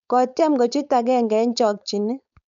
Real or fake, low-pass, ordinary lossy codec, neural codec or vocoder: fake; 7.2 kHz; none; codec, 16 kHz, 4.8 kbps, FACodec